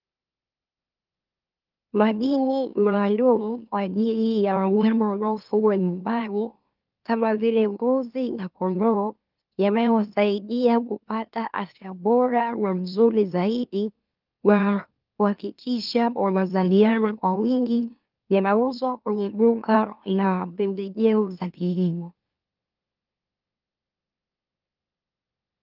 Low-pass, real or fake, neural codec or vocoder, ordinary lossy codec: 5.4 kHz; fake; autoencoder, 44.1 kHz, a latent of 192 numbers a frame, MeloTTS; Opus, 32 kbps